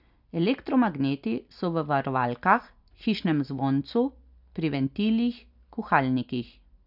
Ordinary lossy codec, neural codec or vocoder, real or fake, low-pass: none; none; real; 5.4 kHz